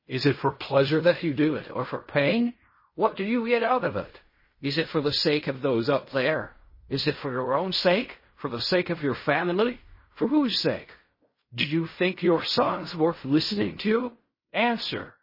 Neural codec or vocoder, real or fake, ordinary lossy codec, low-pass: codec, 16 kHz in and 24 kHz out, 0.4 kbps, LongCat-Audio-Codec, fine tuned four codebook decoder; fake; MP3, 24 kbps; 5.4 kHz